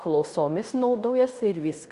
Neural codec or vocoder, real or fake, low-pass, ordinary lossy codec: codec, 16 kHz in and 24 kHz out, 0.9 kbps, LongCat-Audio-Codec, fine tuned four codebook decoder; fake; 10.8 kHz; Opus, 64 kbps